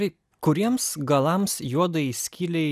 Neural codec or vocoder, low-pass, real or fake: none; 14.4 kHz; real